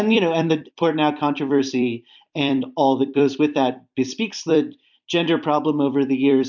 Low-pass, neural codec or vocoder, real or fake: 7.2 kHz; vocoder, 44.1 kHz, 128 mel bands every 256 samples, BigVGAN v2; fake